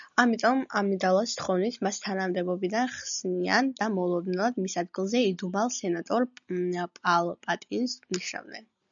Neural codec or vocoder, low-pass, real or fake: none; 7.2 kHz; real